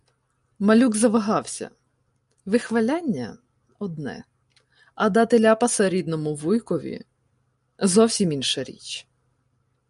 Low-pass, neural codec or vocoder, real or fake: 10.8 kHz; none; real